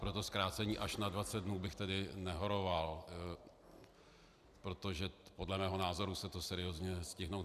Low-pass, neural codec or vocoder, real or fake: 14.4 kHz; none; real